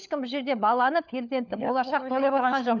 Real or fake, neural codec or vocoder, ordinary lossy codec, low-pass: fake; codec, 16 kHz, 4 kbps, FunCodec, trained on LibriTTS, 50 frames a second; none; 7.2 kHz